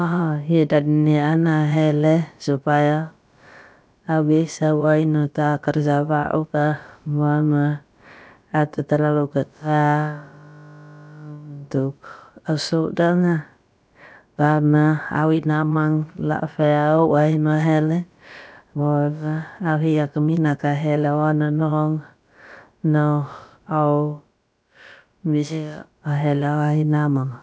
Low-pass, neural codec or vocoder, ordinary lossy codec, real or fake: none; codec, 16 kHz, about 1 kbps, DyCAST, with the encoder's durations; none; fake